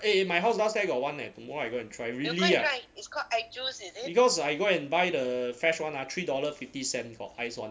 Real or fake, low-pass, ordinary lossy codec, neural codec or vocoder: real; none; none; none